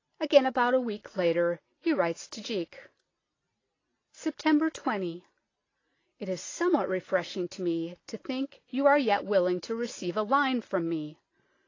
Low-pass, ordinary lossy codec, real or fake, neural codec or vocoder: 7.2 kHz; AAC, 32 kbps; real; none